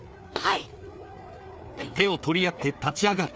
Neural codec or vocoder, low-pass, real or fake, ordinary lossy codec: codec, 16 kHz, 4 kbps, FreqCodec, larger model; none; fake; none